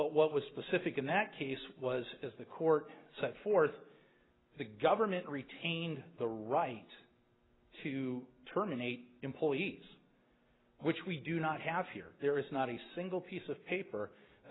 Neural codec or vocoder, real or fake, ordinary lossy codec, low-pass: none; real; AAC, 16 kbps; 7.2 kHz